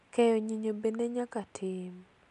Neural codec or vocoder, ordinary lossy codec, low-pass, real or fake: none; none; 10.8 kHz; real